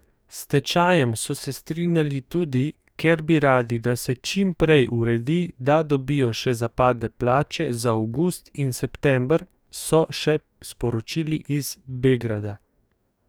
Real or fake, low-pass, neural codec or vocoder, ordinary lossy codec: fake; none; codec, 44.1 kHz, 2.6 kbps, DAC; none